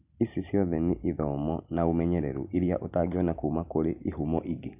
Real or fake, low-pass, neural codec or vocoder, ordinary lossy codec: real; 3.6 kHz; none; none